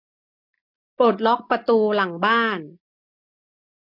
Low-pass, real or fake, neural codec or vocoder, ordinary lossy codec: 5.4 kHz; real; none; MP3, 48 kbps